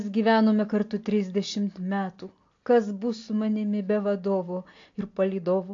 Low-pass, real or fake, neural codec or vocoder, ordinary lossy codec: 7.2 kHz; real; none; AAC, 48 kbps